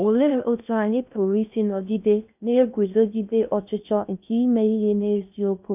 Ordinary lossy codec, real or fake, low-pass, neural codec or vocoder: none; fake; 3.6 kHz; codec, 16 kHz in and 24 kHz out, 0.6 kbps, FocalCodec, streaming, 2048 codes